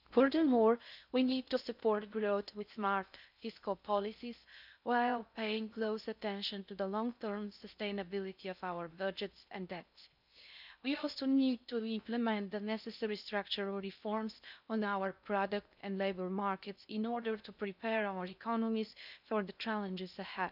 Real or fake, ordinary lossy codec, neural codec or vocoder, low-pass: fake; none; codec, 16 kHz in and 24 kHz out, 0.6 kbps, FocalCodec, streaming, 4096 codes; 5.4 kHz